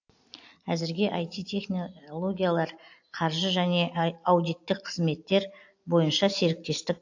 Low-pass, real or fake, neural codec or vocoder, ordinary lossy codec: 7.2 kHz; real; none; AAC, 48 kbps